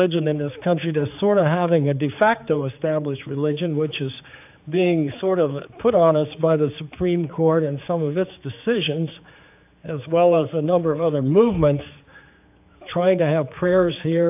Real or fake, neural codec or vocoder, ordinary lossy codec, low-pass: fake; codec, 16 kHz, 4 kbps, X-Codec, HuBERT features, trained on general audio; AAC, 32 kbps; 3.6 kHz